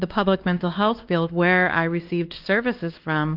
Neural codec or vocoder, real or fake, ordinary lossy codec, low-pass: codec, 16 kHz, 2 kbps, X-Codec, WavLM features, trained on Multilingual LibriSpeech; fake; Opus, 32 kbps; 5.4 kHz